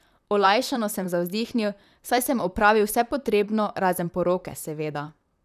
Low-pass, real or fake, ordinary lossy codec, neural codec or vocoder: 14.4 kHz; fake; none; vocoder, 44.1 kHz, 128 mel bands, Pupu-Vocoder